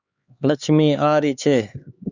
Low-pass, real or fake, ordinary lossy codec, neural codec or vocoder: 7.2 kHz; fake; Opus, 64 kbps; codec, 16 kHz, 4 kbps, X-Codec, HuBERT features, trained on LibriSpeech